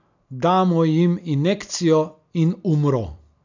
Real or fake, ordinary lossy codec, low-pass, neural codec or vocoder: real; none; 7.2 kHz; none